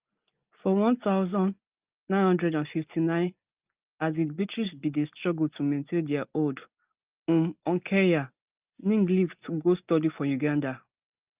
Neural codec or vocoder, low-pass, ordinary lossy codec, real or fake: none; 3.6 kHz; Opus, 24 kbps; real